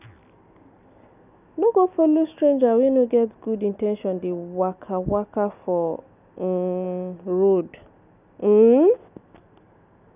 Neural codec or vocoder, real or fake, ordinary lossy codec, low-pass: none; real; none; 3.6 kHz